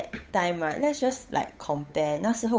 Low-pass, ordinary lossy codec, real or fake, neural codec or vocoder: none; none; fake; codec, 16 kHz, 8 kbps, FunCodec, trained on Chinese and English, 25 frames a second